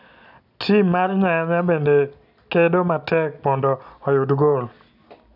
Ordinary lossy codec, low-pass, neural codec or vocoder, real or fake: none; 5.4 kHz; none; real